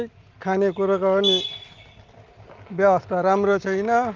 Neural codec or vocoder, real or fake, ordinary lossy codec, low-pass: none; real; Opus, 32 kbps; 7.2 kHz